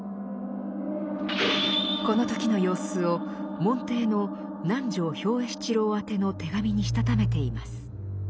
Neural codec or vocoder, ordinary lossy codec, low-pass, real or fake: none; none; none; real